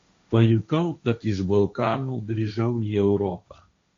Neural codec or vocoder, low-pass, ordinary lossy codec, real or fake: codec, 16 kHz, 1.1 kbps, Voila-Tokenizer; 7.2 kHz; AAC, 48 kbps; fake